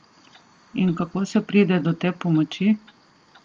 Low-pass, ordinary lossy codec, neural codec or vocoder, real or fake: 7.2 kHz; Opus, 32 kbps; none; real